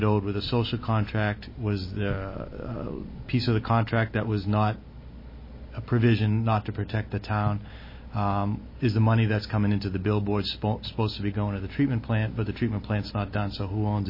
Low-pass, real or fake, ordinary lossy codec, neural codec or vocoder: 5.4 kHz; real; MP3, 24 kbps; none